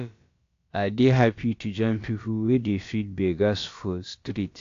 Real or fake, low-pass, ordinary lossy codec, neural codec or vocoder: fake; 7.2 kHz; AAC, 48 kbps; codec, 16 kHz, about 1 kbps, DyCAST, with the encoder's durations